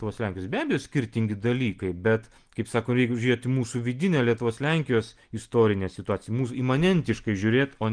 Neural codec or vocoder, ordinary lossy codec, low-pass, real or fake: none; Opus, 24 kbps; 9.9 kHz; real